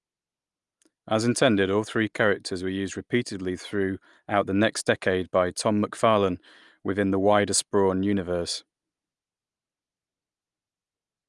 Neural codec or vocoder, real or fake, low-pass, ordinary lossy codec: none; real; 10.8 kHz; Opus, 32 kbps